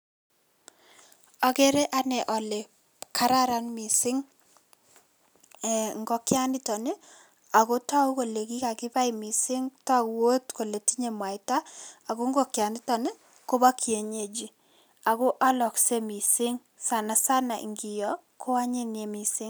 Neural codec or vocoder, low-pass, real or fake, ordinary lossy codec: none; none; real; none